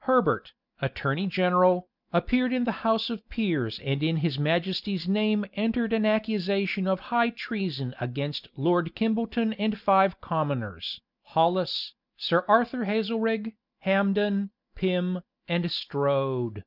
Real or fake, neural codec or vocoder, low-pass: real; none; 5.4 kHz